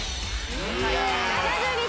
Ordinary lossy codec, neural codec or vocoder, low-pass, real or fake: none; none; none; real